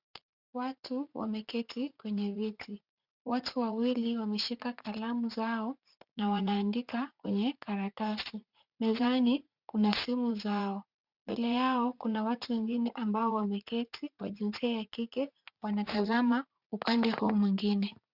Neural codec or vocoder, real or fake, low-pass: vocoder, 44.1 kHz, 80 mel bands, Vocos; fake; 5.4 kHz